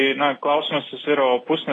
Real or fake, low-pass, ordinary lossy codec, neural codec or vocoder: real; 10.8 kHz; AAC, 32 kbps; none